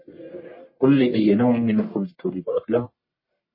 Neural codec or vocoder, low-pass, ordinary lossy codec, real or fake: codec, 44.1 kHz, 1.7 kbps, Pupu-Codec; 5.4 kHz; MP3, 24 kbps; fake